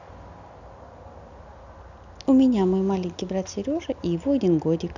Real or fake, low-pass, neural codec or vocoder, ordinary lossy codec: real; 7.2 kHz; none; none